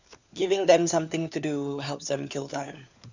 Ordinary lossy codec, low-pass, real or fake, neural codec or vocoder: none; 7.2 kHz; fake; codec, 16 kHz, 4 kbps, FunCodec, trained on LibriTTS, 50 frames a second